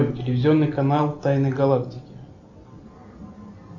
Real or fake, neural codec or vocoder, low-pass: real; none; 7.2 kHz